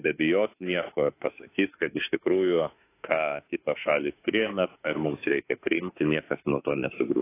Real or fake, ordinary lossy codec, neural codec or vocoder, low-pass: fake; AAC, 24 kbps; autoencoder, 48 kHz, 32 numbers a frame, DAC-VAE, trained on Japanese speech; 3.6 kHz